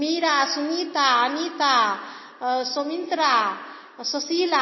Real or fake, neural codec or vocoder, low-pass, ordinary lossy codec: real; none; 7.2 kHz; MP3, 24 kbps